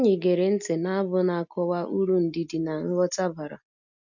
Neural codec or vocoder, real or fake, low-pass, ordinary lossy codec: none; real; 7.2 kHz; none